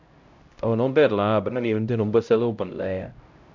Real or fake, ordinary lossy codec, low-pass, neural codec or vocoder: fake; MP3, 64 kbps; 7.2 kHz; codec, 16 kHz, 0.5 kbps, X-Codec, HuBERT features, trained on LibriSpeech